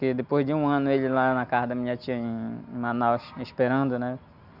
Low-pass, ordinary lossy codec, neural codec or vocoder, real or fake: 5.4 kHz; none; none; real